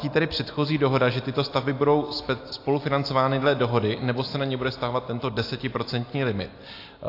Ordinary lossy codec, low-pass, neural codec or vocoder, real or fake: AAC, 32 kbps; 5.4 kHz; none; real